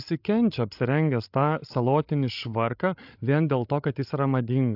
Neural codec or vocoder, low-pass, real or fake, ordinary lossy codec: codec, 16 kHz, 16 kbps, FreqCodec, larger model; 5.4 kHz; fake; AAC, 48 kbps